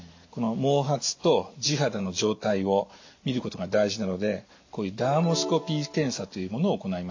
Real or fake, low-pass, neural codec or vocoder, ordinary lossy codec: real; 7.2 kHz; none; AAC, 48 kbps